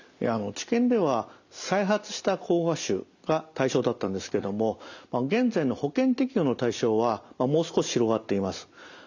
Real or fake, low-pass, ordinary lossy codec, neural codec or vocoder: real; 7.2 kHz; none; none